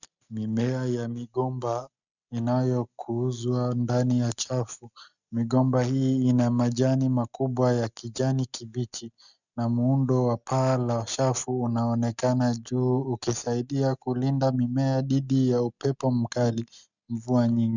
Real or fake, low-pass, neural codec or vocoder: real; 7.2 kHz; none